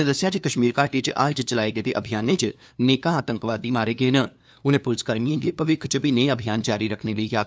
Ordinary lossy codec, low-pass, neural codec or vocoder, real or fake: none; none; codec, 16 kHz, 2 kbps, FunCodec, trained on LibriTTS, 25 frames a second; fake